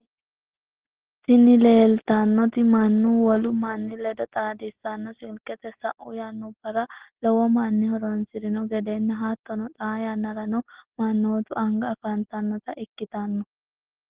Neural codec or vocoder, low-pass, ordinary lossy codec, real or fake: none; 3.6 kHz; Opus, 16 kbps; real